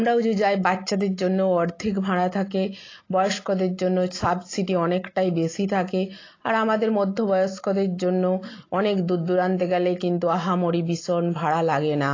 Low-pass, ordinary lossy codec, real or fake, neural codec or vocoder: 7.2 kHz; AAC, 32 kbps; real; none